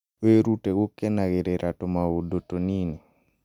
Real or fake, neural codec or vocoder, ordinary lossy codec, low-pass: real; none; none; 19.8 kHz